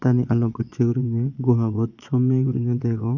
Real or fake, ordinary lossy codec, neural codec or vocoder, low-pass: fake; none; vocoder, 22.05 kHz, 80 mel bands, Vocos; 7.2 kHz